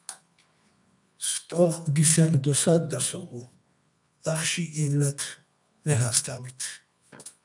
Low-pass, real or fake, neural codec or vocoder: 10.8 kHz; fake; codec, 24 kHz, 0.9 kbps, WavTokenizer, medium music audio release